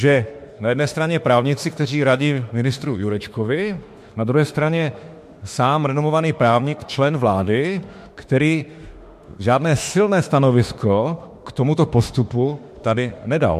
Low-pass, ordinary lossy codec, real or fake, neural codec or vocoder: 14.4 kHz; MP3, 64 kbps; fake; autoencoder, 48 kHz, 32 numbers a frame, DAC-VAE, trained on Japanese speech